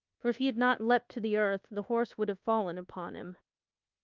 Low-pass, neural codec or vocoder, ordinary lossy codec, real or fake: 7.2 kHz; codec, 24 kHz, 1.2 kbps, DualCodec; Opus, 32 kbps; fake